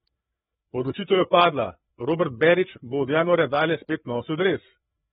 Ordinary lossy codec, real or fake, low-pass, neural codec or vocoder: AAC, 16 kbps; fake; 7.2 kHz; codec, 16 kHz, 4 kbps, FreqCodec, larger model